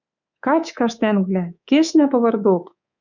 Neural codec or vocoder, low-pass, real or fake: codec, 24 kHz, 3.1 kbps, DualCodec; 7.2 kHz; fake